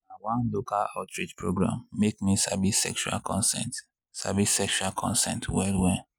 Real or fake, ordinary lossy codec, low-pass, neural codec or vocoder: real; none; none; none